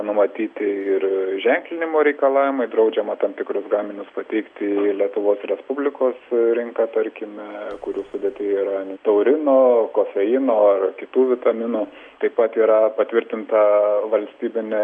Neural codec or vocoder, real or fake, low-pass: none; real; 9.9 kHz